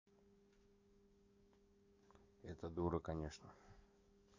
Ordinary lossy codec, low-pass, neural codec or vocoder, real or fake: AAC, 48 kbps; 7.2 kHz; codec, 44.1 kHz, 7.8 kbps, DAC; fake